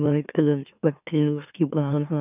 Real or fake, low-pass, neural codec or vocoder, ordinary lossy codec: fake; 3.6 kHz; autoencoder, 44.1 kHz, a latent of 192 numbers a frame, MeloTTS; none